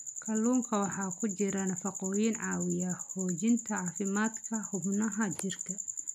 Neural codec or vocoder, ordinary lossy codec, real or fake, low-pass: none; none; real; 14.4 kHz